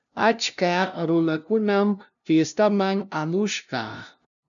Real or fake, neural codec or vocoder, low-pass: fake; codec, 16 kHz, 0.5 kbps, FunCodec, trained on LibriTTS, 25 frames a second; 7.2 kHz